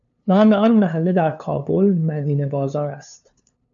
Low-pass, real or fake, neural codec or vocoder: 7.2 kHz; fake; codec, 16 kHz, 2 kbps, FunCodec, trained on LibriTTS, 25 frames a second